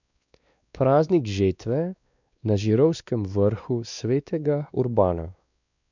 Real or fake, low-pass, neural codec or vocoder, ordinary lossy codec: fake; 7.2 kHz; codec, 16 kHz, 2 kbps, X-Codec, WavLM features, trained on Multilingual LibriSpeech; none